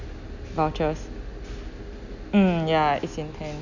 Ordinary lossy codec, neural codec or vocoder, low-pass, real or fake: none; none; 7.2 kHz; real